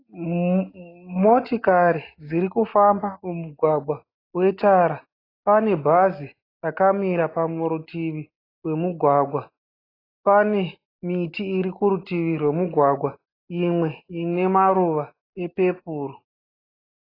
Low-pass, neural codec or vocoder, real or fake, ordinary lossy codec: 5.4 kHz; none; real; AAC, 24 kbps